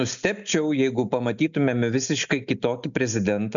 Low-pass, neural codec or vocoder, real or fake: 7.2 kHz; none; real